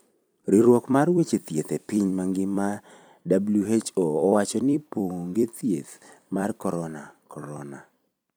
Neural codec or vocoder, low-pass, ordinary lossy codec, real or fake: vocoder, 44.1 kHz, 128 mel bands every 256 samples, BigVGAN v2; none; none; fake